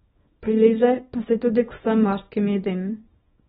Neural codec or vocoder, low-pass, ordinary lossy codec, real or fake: codec, 24 kHz, 0.9 kbps, WavTokenizer, small release; 10.8 kHz; AAC, 16 kbps; fake